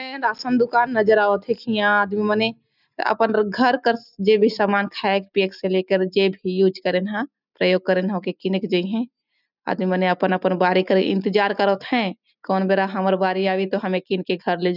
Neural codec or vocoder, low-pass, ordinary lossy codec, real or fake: none; 5.4 kHz; none; real